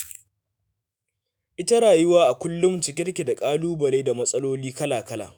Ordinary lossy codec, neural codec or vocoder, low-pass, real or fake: none; autoencoder, 48 kHz, 128 numbers a frame, DAC-VAE, trained on Japanese speech; none; fake